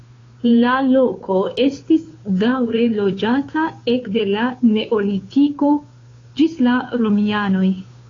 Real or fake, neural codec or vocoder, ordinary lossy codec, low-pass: fake; codec, 16 kHz, 2 kbps, FunCodec, trained on Chinese and English, 25 frames a second; AAC, 32 kbps; 7.2 kHz